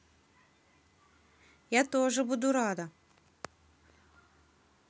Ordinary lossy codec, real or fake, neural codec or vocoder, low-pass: none; real; none; none